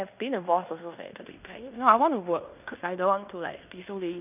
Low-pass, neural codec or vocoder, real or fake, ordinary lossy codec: 3.6 kHz; codec, 16 kHz in and 24 kHz out, 0.9 kbps, LongCat-Audio-Codec, fine tuned four codebook decoder; fake; none